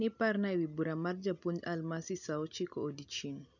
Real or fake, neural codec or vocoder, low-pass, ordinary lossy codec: real; none; 7.2 kHz; none